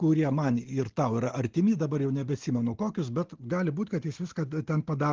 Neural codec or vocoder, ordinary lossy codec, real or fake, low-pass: none; Opus, 16 kbps; real; 7.2 kHz